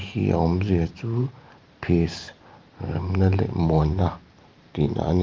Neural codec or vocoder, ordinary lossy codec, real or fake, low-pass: vocoder, 44.1 kHz, 128 mel bands every 512 samples, BigVGAN v2; Opus, 24 kbps; fake; 7.2 kHz